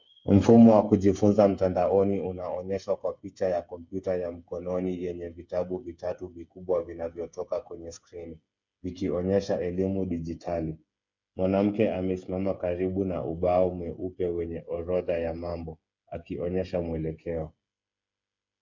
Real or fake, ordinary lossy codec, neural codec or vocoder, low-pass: fake; MP3, 64 kbps; codec, 16 kHz, 8 kbps, FreqCodec, smaller model; 7.2 kHz